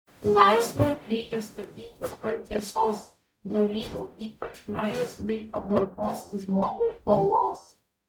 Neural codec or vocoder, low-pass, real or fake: codec, 44.1 kHz, 0.9 kbps, DAC; 19.8 kHz; fake